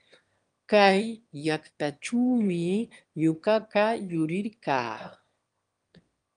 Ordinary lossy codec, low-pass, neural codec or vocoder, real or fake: Opus, 32 kbps; 9.9 kHz; autoencoder, 22.05 kHz, a latent of 192 numbers a frame, VITS, trained on one speaker; fake